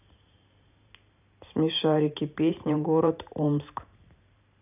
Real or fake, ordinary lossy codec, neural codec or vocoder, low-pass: fake; none; vocoder, 44.1 kHz, 128 mel bands every 512 samples, BigVGAN v2; 3.6 kHz